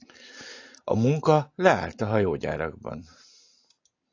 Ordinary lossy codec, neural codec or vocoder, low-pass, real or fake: AAC, 48 kbps; none; 7.2 kHz; real